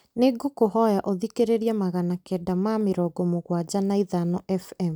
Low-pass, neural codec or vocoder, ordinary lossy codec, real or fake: none; none; none; real